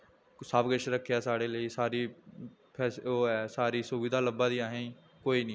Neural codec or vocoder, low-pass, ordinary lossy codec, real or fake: none; none; none; real